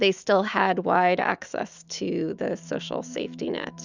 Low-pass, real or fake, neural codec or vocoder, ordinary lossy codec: 7.2 kHz; fake; autoencoder, 48 kHz, 128 numbers a frame, DAC-VAE, trained on Japanese speech; Opus, 64 kbps